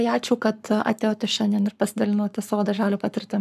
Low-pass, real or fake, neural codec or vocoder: 14.4 kHz; fake; codec, 44.1 kHz, 7.8 kbps, Pupu-Codec